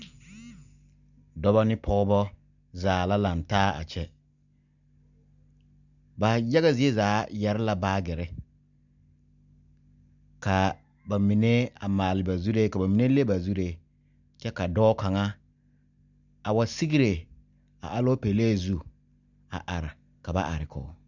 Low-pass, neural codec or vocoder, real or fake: 7.2 kHz; none; real